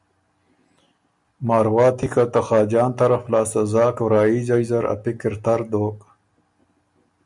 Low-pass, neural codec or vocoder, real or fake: 10.8 kHz; none; real